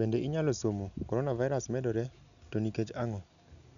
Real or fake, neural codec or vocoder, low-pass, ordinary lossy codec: real; none; 7.2 kHz; none